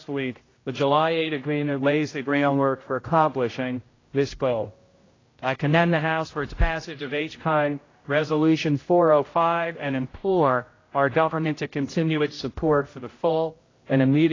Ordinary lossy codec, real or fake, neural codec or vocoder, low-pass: AAC, 32 kbps; fake; codec, 16 kHz, 0.5 kbps, X-Codec, HuBERT features, trained on general audio; 7.2 kHz